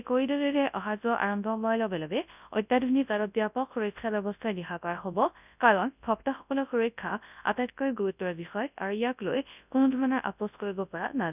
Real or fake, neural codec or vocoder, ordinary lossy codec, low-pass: fake; codec, 24 kHz, 0.9 kbps, WavTokenizer, large speech release; none; 3.6 kHz